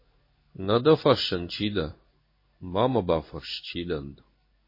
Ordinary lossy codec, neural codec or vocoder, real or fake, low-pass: MP3, 24 kbps; codec, 16 kHz in and 24 kHz out, 1 kbps, XY-Tokenizer; fake; 5.4 kHz